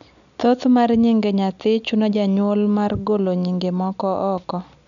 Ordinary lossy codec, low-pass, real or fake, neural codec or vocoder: none; 7.2 kHz; real; none